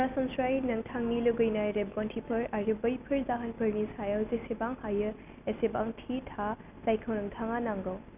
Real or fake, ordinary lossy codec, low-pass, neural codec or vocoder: real; none; 3.6 kHz; none